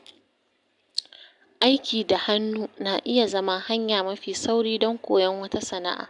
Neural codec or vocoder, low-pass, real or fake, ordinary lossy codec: none; 10.8 kHz; real; none